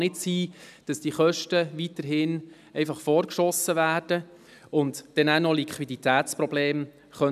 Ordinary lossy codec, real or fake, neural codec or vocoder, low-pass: none; real; none; 14.4 kHz